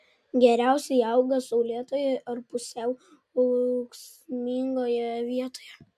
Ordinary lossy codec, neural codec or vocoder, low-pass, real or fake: MP3, 96 kbps; none; 14.4 kHz; real